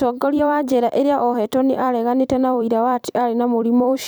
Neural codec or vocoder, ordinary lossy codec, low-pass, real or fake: vocoder, 44.1 kHz, 128 mel bands every 256 samples, BigVGAN v2; none; none; fake